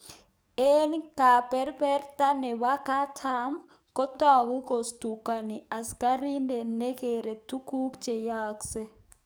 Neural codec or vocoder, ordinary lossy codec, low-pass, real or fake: codec, 44.1 kHz, 7.8 kbps, DAC; none; none; fake